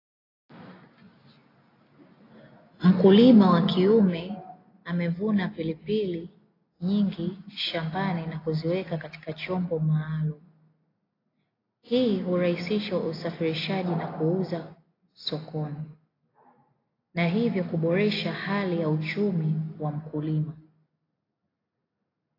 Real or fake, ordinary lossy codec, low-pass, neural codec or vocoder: real; AAC, 24 kbps; 5.4 kHz; none